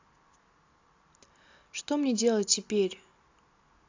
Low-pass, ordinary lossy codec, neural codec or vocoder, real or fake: 7.2 kHz; AAC, 48 kbps; none; real